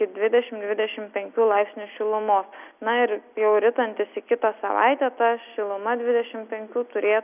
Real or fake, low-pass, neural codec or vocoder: real; 3.6 kHz; none